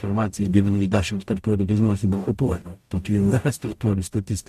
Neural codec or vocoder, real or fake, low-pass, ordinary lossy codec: codec, 44.1 kHz, 0.9 kbps, DAC; fake; 14.4 kHz; MP3, 64 kbps